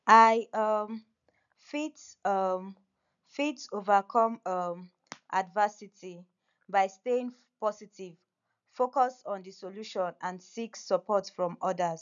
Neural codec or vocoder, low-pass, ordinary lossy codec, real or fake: none; 7.2 kHz; none; real